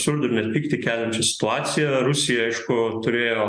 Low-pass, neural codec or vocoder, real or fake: 9.9 kHz; none; real